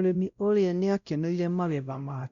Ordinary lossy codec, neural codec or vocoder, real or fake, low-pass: Opus, 64 kbps; codec, 16 kHz, 0.5 kbps, X-Codec, WavLM features, trained on Multilingual LibriSpeech; fake; 7.2 kHz